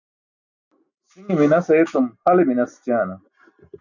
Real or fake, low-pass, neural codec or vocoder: real; 7.2 kHz; none